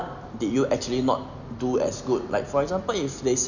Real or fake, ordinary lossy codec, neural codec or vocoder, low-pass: real; none; none; 7.2 kHz